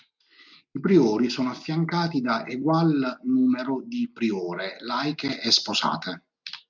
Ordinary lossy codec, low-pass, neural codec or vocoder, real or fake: MP3, 64 kbps; 7.2 kHz; none; real